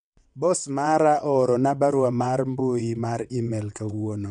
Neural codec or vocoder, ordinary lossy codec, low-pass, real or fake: vocoder, 22.05 kHz, 80 mel bands, WaveNeXt; none; 9.9 kHz; fake